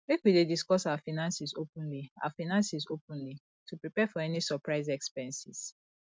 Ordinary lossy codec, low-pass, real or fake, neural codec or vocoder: none; none; real; none